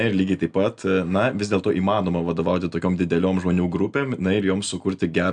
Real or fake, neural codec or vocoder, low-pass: real; none; 9.9 kHz